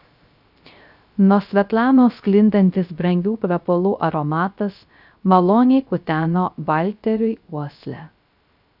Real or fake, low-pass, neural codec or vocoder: fake; 5.4 kHz; codec, 16 kHz, 0.3 kbps, FocalCodec